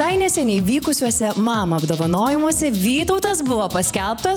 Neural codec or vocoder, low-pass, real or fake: vocoder, 48 kHz, 128 mel bands, Vocos; 19.8 kHz; fake